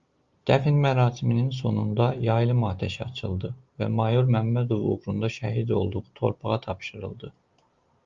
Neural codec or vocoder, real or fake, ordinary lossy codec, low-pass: none; real; Opus, 32 kbps; 7.2 kHz